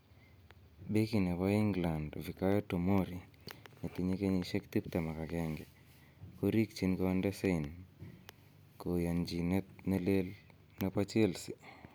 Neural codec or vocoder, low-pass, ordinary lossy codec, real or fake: none; none; none; real